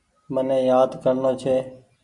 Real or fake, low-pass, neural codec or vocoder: real; 10.8 kHz; none